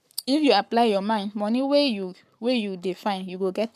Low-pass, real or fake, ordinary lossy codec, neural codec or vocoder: 14.4 kHz; fake; none; codec, 44.1 kHz, 7.8 kbps, Pupu-Codec